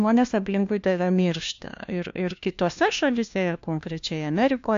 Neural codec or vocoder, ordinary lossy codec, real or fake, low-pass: codec, 16 kHz, 1 kbps, FunCodec, trained on LibriTTS, 50 frames a second; AAC, 64 kbps; fake; 7.2 kHz